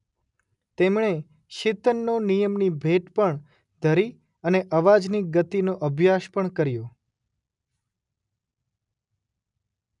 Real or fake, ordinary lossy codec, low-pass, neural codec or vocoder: real; none; 10.8 kHz; none